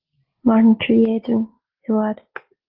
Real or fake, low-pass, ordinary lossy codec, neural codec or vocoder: real; 5.4 kHz; Opus, 16 kbps; none